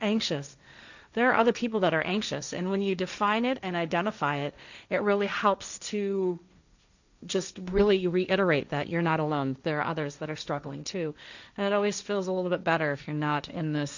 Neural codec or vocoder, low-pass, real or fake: codec, 16 kHz, 1.1 kbps, Voila-Tokenizer; 7.2 kHz; fake